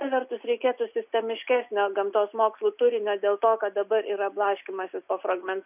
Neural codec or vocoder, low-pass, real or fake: none; 3.6 kHz; real